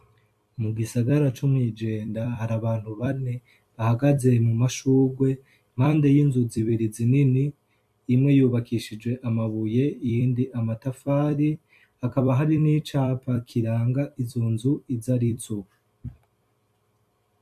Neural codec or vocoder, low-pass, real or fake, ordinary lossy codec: vocoder, 44.1 kHz, 128 mel bands every 256 samples, BigVGAN v2; 14.4 kHz; fake; MP3, 64 kbps